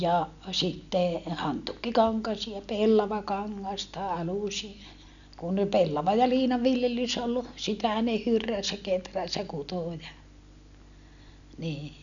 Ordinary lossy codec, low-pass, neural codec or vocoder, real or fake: none; 7.2 kHz; none; real